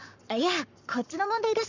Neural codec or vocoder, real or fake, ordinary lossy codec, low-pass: codec, 44.1 kHz, 7.8 kbps, Pupu-Codec; fake; none; 7.2 kHz